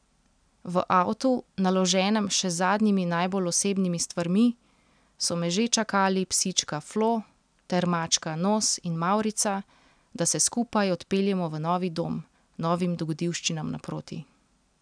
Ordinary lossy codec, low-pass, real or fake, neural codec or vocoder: none; 9.9 kHz; real; none